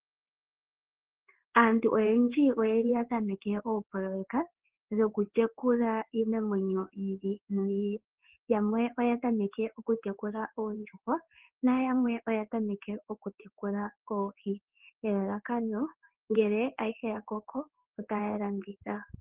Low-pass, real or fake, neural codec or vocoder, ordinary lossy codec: 3.6 kHz; fake; codec, 16 kHz in and 24 kHz out, 1 kbps, XY-Tokenizer; Opus, 16 kbps